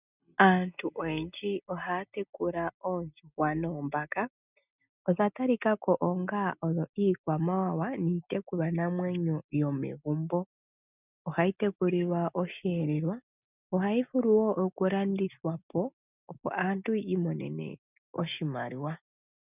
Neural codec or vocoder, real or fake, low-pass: none; real; 3.6 kHz